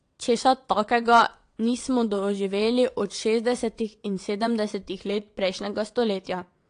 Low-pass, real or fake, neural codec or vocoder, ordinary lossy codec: 9.9 kHz; fake; vocoder, 22.05 kHz, 80 mel bands, WaveNeXt; AAC, 48 kbps